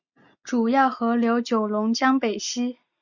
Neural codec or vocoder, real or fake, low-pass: none; real; 7.2 kHz